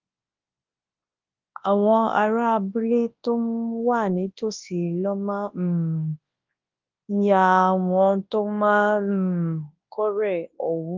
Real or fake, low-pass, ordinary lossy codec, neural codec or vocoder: fake; 7.2 kHz; Opus, 32 kbps; codec, 24 kHz, 0.9 kbps, WavTokenizer, large speech release